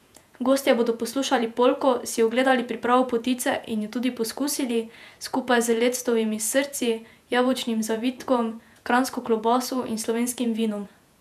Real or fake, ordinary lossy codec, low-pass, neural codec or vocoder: fake; none; 14.4 kHz; vocoder, 48 kHz, 128 mel bands, Vocos